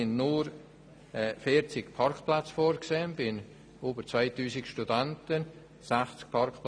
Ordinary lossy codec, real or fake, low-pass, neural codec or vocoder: none; real; 9.9 kHz; none